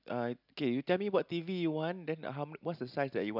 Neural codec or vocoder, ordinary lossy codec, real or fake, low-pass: none; none; real; 5.4 kHz